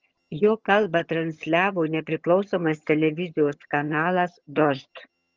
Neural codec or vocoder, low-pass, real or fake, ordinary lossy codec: vocoder, 22.05 kHz, 80 mel bands, HiFi-GAN; 7.2 kHz; fake; Opus, 24 kbps